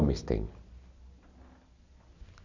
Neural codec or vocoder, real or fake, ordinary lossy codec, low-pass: none; real; none; 7.2 kHz